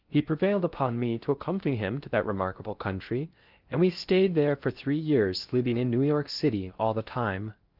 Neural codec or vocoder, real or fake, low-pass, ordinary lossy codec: codec, 16 kHz in and 24 kHz out, 0.6 kbps, FocalCodec, streaming, 2048 codes; fake; 5.4 kHz; Opus, 32 kbps